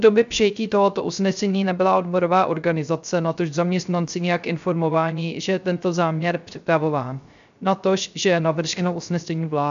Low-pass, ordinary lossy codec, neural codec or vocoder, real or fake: 7.2 kHz; AAC, 96 kbps; codec, 16 kHz, 0.3 kbps, FocalCodec; fake